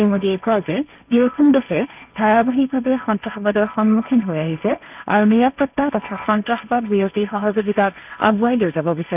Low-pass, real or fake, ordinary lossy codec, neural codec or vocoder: 3.6 kHz; fake; none; codec, 16 kHz, 1.1 kbps, Voila-Tokenizer